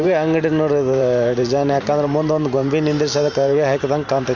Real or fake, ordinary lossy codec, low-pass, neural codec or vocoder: fake; none; 7.2 kHz; vocoder, 44.1 kHz, 128 mel bands every 512 samples, BigVGAN v2